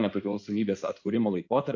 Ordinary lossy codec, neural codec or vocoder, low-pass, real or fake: AAC, 48 kbps; codec, 16 kHz in and 24 kHz out, 2.2 kbps, FireRedTTS-2 codec; 7.2 kHz; fake